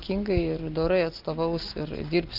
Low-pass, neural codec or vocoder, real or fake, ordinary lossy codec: 5.4 kHz; none; real; Opus, 32 kbps